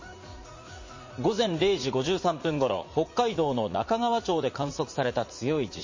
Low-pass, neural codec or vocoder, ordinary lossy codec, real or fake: 7.2 kHz; vocoder, 44.1 kHz, 80 mel bands, Vocos; MP3, 32 kbps; fake